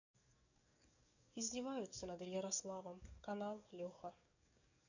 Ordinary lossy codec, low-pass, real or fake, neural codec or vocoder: none; 7.2 kHz; fake; codec, 44.1 kHz, 7.8 kbps, DAC